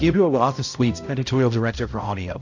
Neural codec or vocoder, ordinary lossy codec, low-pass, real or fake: codec, 16 kHz, 0.5 kbps, X-Codec, HuBERT features, trained on balanced general audio; AAC, 48 kbps; 7.2 kHz; fake